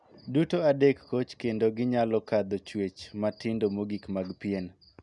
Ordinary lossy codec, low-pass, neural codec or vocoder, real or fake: none; 10.8 kHz; none; real